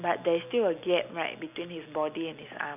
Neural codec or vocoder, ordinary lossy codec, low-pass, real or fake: none; none; 3.6 kHz; real